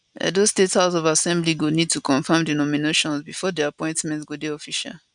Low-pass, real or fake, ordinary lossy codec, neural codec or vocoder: 9.9 kHz; real; none; none